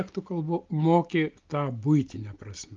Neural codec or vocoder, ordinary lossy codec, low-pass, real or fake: none; Opus, 32 kbps; 7.2 kHz; real